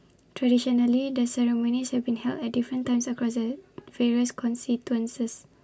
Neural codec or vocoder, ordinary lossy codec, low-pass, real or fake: none; none; none; real